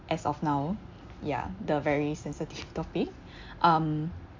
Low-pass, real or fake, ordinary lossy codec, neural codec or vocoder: 7.2 kHz; real; MP3, 64 kbps; none